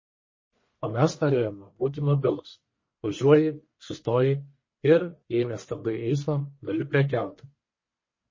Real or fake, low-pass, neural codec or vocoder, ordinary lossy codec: fake; 7.2 kHz; codec, 44.1 kHz, 1.7 kbps, Pupu-Codec; MP3, 32 kbps